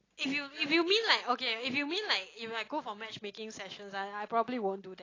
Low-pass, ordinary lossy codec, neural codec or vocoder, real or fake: 7.2 kHz; AAC, 32 kbps; none; real